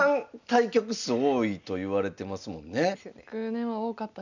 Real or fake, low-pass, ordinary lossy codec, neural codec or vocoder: real; 7.2 kHz; none; none